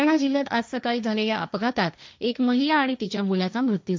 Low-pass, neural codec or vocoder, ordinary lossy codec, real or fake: none; codec, 16 kHz, 1.1 kbps, Voila-Tokenizer; none; fake